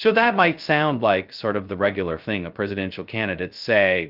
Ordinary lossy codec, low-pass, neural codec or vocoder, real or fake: Opus, 32 kbps; 5.4 kHz; codec, 16 kHz, 0.2 kbps, FocalCodec; fake